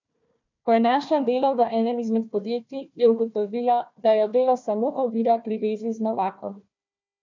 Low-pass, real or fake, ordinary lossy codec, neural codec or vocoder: 7.2 kHz; fake; MP3, 64 kbps; codec, 16 kHz, 1 kbps, FunCodec, trained on Chinese and English, 50 frames a second